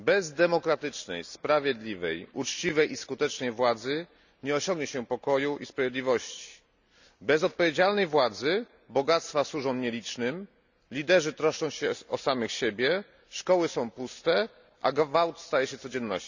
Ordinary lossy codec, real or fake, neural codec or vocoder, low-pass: none; real; none; 7.2 kHz